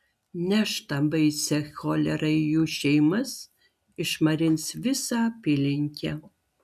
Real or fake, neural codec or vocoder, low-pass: real; none; 14.4 kHz